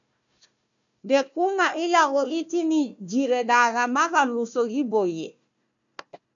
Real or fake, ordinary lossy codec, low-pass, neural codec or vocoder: fake; MP3, 96 kbps; 7.2 kHz; codec, 16 kHz, 1 kbps, FunCodec, trained on Chinese and English, 50 frames a second